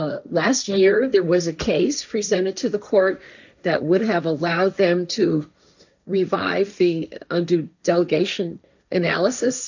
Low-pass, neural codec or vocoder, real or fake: 7.2 kHz; codec, 16 kHz, 1.1 kbps, Voila-Tokenizer; fake